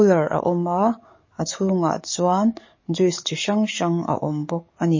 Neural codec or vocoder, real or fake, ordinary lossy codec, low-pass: codec, 44.1 kHz, 7.8 kbps, DAC; fake; MP3, 32 kbps; 7.2 kHz